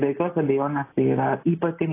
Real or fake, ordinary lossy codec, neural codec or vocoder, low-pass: real; AAC, 24 kbps; none; 3.6 kHz